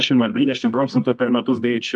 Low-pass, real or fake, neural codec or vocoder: 10.8 kHz; fake; codec, 24 kHz, 0.9 kbps, WavTokenizer, medium music audio release